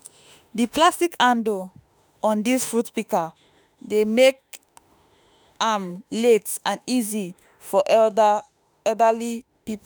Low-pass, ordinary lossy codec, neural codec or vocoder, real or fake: none; none; autoencoder, 48 kHz, 32 numbers a frame, DAC-VAE, trained on Japanese speech; fake